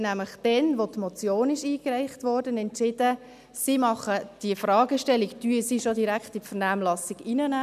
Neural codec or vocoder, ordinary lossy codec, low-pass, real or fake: none; none; 14.4 kHz; real